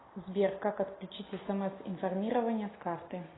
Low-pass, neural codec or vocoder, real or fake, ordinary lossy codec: 7.2 kHz; none; real; AAC, 16 kbps